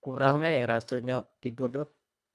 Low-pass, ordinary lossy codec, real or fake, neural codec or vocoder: none; none; fake; codec, 24 kHz, 1.5 kbps, HILCodec